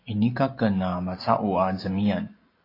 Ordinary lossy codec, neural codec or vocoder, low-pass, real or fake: AAC, 24 kbps; none; 5.4 kHz; real